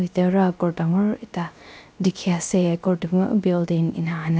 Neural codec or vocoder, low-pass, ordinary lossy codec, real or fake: codec, 16 kHz, 0.3 kbps, FocalCodec; none; none; fake